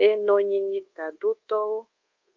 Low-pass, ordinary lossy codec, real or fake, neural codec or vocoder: 7.2 kHz; Opus, 24 kbps; fake; codec, 24 kHz, 1.2 kbps, DualCodec